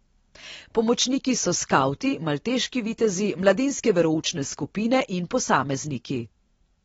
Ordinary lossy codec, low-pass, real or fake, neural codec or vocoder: AAC, 24 kbps; 19.8 kHz; real; none